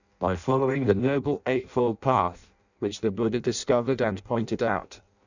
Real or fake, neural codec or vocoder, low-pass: fake; codec, 16 kHz in and 24 kHz out, 0.6 kbps, FireRedTTS-2 codec; 7.2 kHz